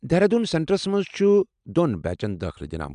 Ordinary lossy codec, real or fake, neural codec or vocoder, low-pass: MP3, 96 kbps; real; none; 9.9 kHz